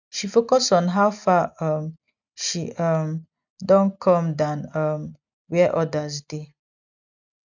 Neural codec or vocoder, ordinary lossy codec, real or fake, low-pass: none; none; real; 7.2 kHz